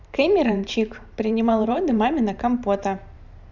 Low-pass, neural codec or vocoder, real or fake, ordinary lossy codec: 7.2 kHz; vocoder, 44.1 kHz, 128 mel bands every 512 samples, BigVGAN v2; fake; none